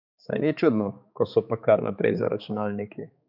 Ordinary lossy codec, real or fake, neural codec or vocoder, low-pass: none; fake; codec, 16 kHz, 4 kbps, X-Codec, HuBERT features, trained on balanced general audio; 5.4 kHz